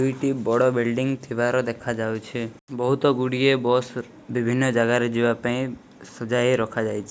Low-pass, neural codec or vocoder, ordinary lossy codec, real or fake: none; none; none; real